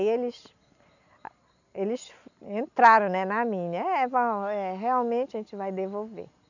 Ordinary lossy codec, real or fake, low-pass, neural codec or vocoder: none; real; 7.2 kHz; none